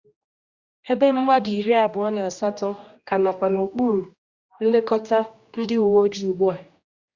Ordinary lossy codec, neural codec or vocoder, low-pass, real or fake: Opus, 64 kbps; codec, 16 kHz, 1 kbps, X-Codec, HuBERT features, trained on general audio; 7.2 kHz; fake